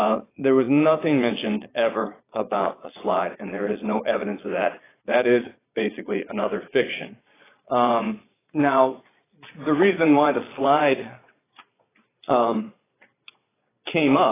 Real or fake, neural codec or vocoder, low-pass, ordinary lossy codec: fake; vocoder, 22.05 kHz, 80 mel bands, Vocos; 3.6 kHz; AAC, 16 kbps